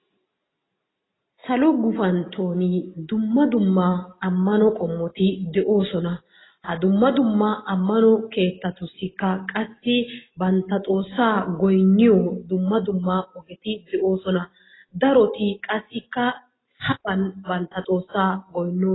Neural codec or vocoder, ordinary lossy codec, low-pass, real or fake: none; AAC, 16 kbps; 7.2 kHz; real